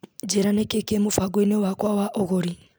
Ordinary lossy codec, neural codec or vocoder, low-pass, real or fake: none; none; none; real